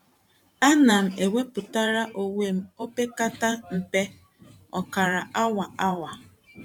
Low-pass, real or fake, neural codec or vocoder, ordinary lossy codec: 19.8 kHz; fake; vocoder, 44.1 kHz, 128 mel bands every 512 samples, BigVGAN v2; none